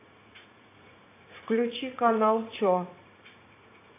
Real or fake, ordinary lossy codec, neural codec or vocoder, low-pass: fake; AAC, 24 kbps; vocoder, 44.1 kHz, 80 mel bands, Vocos; 3.6 kHz